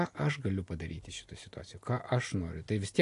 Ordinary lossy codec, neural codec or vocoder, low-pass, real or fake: AAC, 48 kbps; none; 10.8 kHz; real